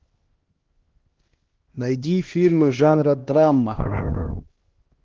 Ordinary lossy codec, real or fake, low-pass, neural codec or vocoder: Opus, 16 kbps; fake; 7.2 kHz; codec, 16 kHz, 1 kbps, X-Codec, HuBERT features, trained on LibriSpeech